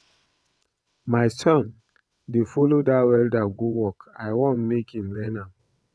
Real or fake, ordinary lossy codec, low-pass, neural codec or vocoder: fake; none; none; vocoder, 22.05 kHz, 80 mel bands, WaveNeXt